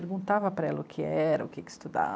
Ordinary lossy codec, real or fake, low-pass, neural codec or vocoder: none; real; none; none